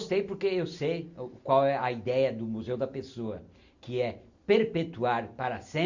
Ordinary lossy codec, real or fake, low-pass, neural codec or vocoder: Opus, 64 kbps; real; 7.2 kHz; none